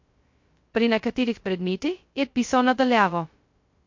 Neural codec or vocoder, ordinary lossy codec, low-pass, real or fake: codec, 16 kHz, 0.2 kbps, FocalCodec; MP3, 48 kbps; 7.2 kHz; fake